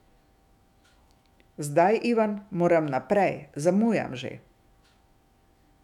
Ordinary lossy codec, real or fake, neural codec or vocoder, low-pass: none; fake; autoencoder, 48 kHz, 128 numbers a frame, DAC-VAE, trained on Japanese speech; 19.8 kHz